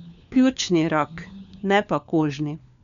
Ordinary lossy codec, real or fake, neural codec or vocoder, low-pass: none; fake; codec, 16 kHz, 4 kbps, FunCodec, trained on LibriTTS, 50 frames a second; 7.2 kHz